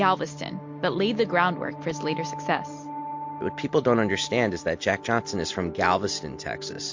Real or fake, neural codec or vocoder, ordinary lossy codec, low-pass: real; none; MP3, 48 kbps; 7.2 kHz